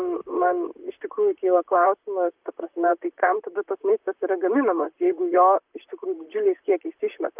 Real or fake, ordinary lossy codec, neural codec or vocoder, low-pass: fake; Opus, 24 kbps; vocoder, 44.1 kHz, 128 mel bands, Pupu-Vocoder; 3.6 kHz